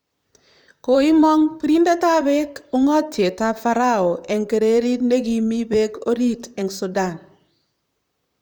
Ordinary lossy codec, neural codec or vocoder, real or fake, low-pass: none; vocoder, 44.1 kHz, 128 mel bands, Pupu-Vocoder; fake; none